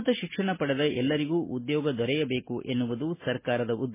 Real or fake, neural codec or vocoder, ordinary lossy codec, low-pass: real; none; MP3, 16 kbps; 3.6 kHz